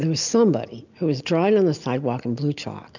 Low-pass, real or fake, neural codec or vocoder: 7.2 kHz; real; none